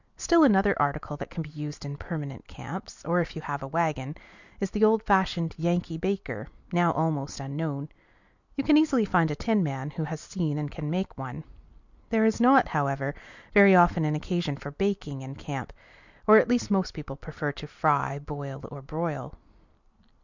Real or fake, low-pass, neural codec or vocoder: real; 7.2 kHz; none